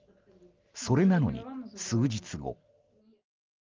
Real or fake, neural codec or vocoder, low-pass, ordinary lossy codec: real; none; 7.2 kHz; Opus, 32 kbps